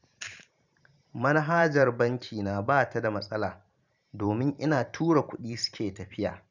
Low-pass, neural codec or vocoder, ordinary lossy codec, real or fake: 7.2 kHz; vocoder, 44.1 kHz, 128 mel bands every 512 samples, BigVGAN v2; none; fake